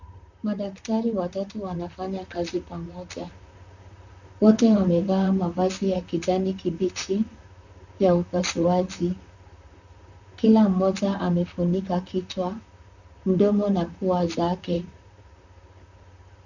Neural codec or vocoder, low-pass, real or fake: vocoder, 44.1 kHz, 128 mel bands, Pupu-Vocoder; 7.2 kHz; fake